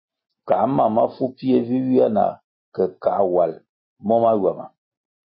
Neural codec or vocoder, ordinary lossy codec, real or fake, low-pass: none; MP3, 24 kbps; real; 7.2 kHz